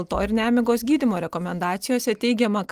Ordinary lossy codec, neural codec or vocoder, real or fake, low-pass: Opus, 32 kbps; none; real; 14.4 kHz